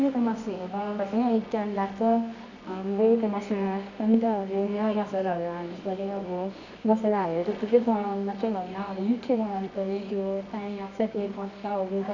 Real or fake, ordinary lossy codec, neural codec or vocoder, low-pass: fake; none; codec, 24 kHz, 0.9 kbps, WavTokenizer, medium music audio release; 7.2 kHz